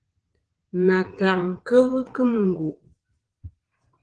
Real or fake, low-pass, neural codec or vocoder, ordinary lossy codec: fake; 9.9 kHz; vocoder, 22.05 kHz, 80 mel bands, WaveNeXt; Opus, 16 kbps